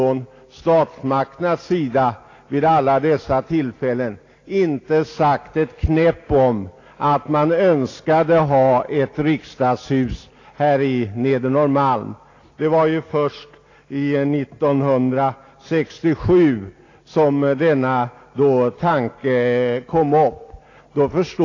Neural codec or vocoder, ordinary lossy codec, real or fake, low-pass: none; AAC, 32 kbps; real; 7.2 kHz